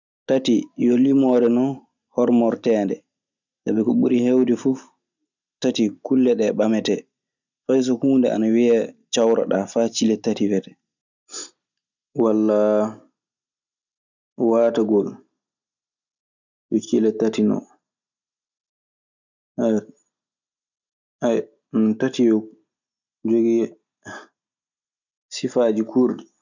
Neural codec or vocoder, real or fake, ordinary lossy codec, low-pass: none; real; none; 7.2 kHz